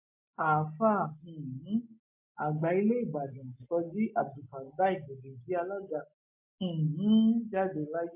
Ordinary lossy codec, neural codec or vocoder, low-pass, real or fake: MP3, 24 kbps; none; 3.6 kHz; real